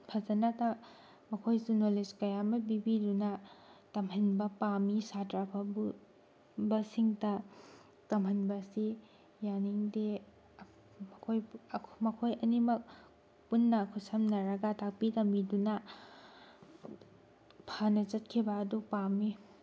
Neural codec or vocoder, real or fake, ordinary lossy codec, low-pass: none; real; none; none